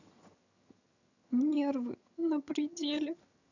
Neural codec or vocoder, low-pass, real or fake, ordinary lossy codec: vocoder, 22.05 kHz, 80 mel bands, HiFi-GAN; 7.2 kHz; fake; none